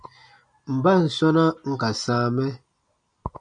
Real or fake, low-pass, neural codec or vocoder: real; 9.9 kHz; none